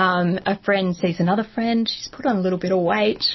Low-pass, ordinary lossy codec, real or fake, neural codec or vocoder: 7.2 kHz; MP3, 24 kbps; real; none